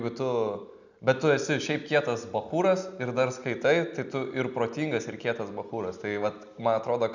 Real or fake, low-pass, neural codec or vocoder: real; 7.2 kHz; none